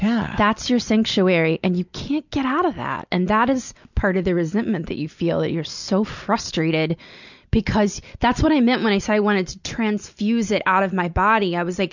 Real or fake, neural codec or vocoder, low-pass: real; none; 7.2 kHz